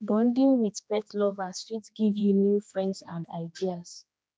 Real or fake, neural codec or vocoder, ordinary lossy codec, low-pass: fake; codec, 16 kHz, 2 kbps, X-Codec, HuBERT features, trained on general audio; none; none